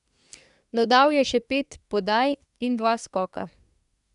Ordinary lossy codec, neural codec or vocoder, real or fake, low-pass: none; codec, 24 kHz, 1 kbps, SNAC; fake; 10.8 kHz